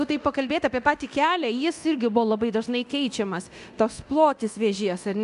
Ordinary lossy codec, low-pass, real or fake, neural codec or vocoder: MP3, 96 kbps; 10.8 kHz; fake; codec, 24 kHz, 0.9 kbps, DualCodec